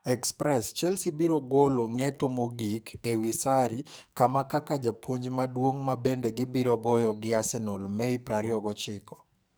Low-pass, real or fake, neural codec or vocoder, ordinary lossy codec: none; fake; codec, 44.1 kHz, 2.6 kbps, SNAC; none